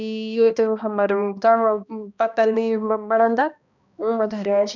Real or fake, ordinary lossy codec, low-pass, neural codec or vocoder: fake; none; 7.2 kHz; codec, 16 kHz, 1 kbps, X-Codec, HuBERT features, trained on balanced general audio